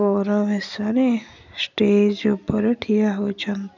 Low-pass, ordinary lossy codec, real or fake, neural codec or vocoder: 7.2 kHz; none; real; none